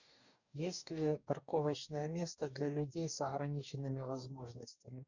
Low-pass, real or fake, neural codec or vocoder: 7.2 kHz; fake; codec, 44.1 kHz, 2.6 kbps, DAC